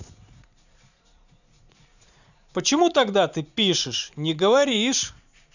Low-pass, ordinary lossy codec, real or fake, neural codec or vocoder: 7.2 kHz; none; fake; vocoder, 44.1 kHz, 80 mel bands, Vocos